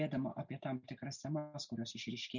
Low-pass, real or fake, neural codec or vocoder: 7.2 kHz; real; none